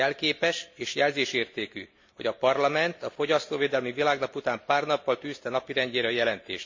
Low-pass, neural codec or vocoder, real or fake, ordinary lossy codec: 7.2 kHz; none; real; MP3, 48 kbps